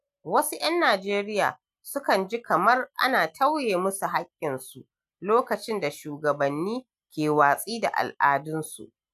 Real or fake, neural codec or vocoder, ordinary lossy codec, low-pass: real; none; none; 14.4 kHz